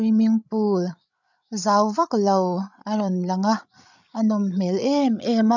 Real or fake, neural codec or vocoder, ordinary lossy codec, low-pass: fake; codec, 16 kHz, 8 kbps, FreqCodec, larger model; none; 7.2 kHz